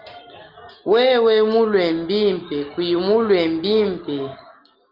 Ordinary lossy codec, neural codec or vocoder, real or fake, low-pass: Opus, 32 kbps; none; real; 5.4 kHz